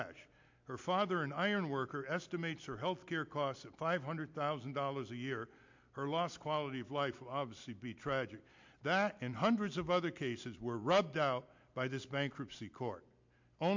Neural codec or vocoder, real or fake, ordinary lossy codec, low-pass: none; real; MP3, 48 kbps; 7.2 kHz